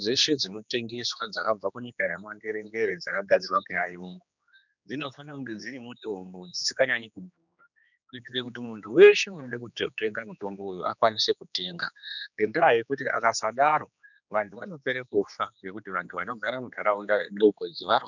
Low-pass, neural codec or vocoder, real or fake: 7.2 kHz; codec, 16 kHz, 2 kbps, X-Codec, HuBERT features, trained on general audio; fake